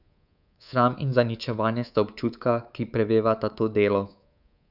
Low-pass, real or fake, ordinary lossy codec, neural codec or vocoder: 5.4 kHz; fake; none; codec, 24 kHz, 3.1 kbps, DualCodec